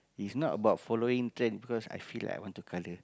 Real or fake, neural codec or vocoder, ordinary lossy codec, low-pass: real; none; none; none